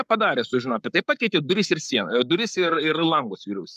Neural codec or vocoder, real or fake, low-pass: codec, 44.1 kHz, 7.8 kbps, Pupu-Codec; fake; 14.4 kHz